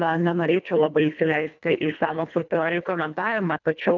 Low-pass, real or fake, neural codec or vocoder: 7.2 kHz; fake; codec, 24 kHz, 1.5 kbps, HILCodec